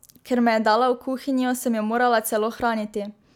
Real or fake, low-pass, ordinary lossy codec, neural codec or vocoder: real; 19.8 kHz; MP3, 96 kbps; none